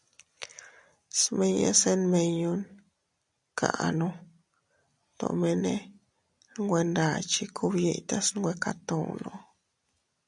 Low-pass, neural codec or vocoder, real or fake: 10.8 kHz; none; real